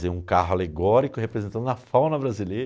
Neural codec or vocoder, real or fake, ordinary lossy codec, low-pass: none; real; none; none